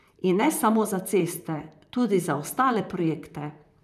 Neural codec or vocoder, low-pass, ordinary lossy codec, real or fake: vocoder, 44.1 kHz, 128 mel bands, Pupu-Vocoder; 14.4 kHz; none; fake